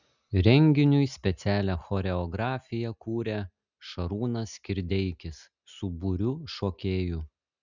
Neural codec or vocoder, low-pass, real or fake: none; 7.2 kHz; real